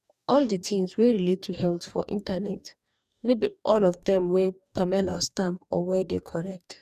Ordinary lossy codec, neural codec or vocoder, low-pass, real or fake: none; codec, 44.1 kHz, 2.6 kbps, DAC; 14.4 kHz; fake